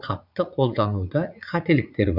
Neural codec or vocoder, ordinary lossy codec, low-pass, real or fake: vocoder, 44.1 kHz, 80 mel bands, Vocos; none; 5.4 kHz; fake